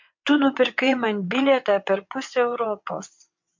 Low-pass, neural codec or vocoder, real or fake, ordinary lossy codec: 7.2 kHz; vocoder, 22.05 kHz, 80 mel bands, WaveNeXt; fake; MP3, 48 kbps